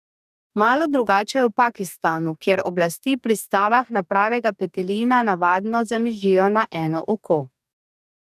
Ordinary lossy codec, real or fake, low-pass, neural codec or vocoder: none; fake; 14.4 kHz; codec, 44.1 kHz, 2.6 kbps, DAC